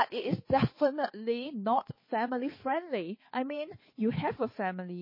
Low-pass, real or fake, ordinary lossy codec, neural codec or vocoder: 5.4 kHz; fake; MP3, 24 kbps; codec, 16 kHz, 4 kbps, X-Codec, HuBERT features, trained on balanced general audio